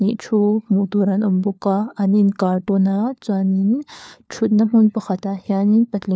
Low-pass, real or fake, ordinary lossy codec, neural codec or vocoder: none; fake; none; codec, 16 kHz, 4 kbps, FunCodec, trained on LibriTTS, 50 frames a second